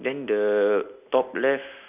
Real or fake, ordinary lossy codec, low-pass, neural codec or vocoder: real; none; 3.6 kHz; none